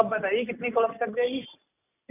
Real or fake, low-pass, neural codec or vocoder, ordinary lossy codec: real; 3.6 kHz; none; none